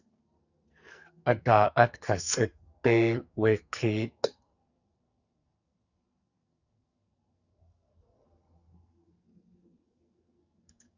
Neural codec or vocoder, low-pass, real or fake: codec, 44.1 kHz, 2.6 kbps, SNAC; 7.2 kHz; fake